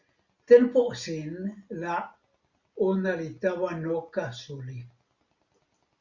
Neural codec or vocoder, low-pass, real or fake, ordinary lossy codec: none; 7.2 kHz; real; Opus, 64 kbps